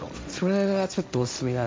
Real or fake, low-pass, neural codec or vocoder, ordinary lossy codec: fake; none; codec, 16 kHz, 1.1 kbps, Voila-Tokenizer; none